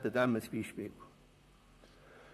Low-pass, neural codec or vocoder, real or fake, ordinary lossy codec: 14.4 kHz; vocoder, 44.1 kHz, 128 mel bands, Pupu-Vocoder; fake; none